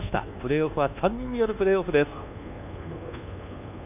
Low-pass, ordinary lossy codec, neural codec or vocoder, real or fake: 3.6 kHz; none; codec, 24 kHz, 1.2 kbps, DualCodec; fake